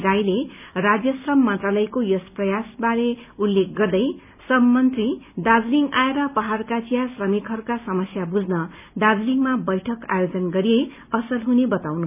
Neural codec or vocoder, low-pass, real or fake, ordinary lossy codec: none; 3.6 kHz; real; none